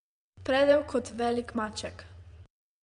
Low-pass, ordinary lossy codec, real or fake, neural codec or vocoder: 9.9 kHz; MP3, 64 kbps; fake; vocoder, 22.05 kHz, 80 mel bands, WaveNeXt